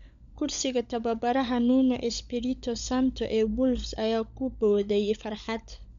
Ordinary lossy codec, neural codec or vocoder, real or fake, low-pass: MP3, 64 kbps; codec, 16 kHz, 16 kbps, FunCodec, trained on LibriTTS, 50 frames a second; fake; 7.2 kHz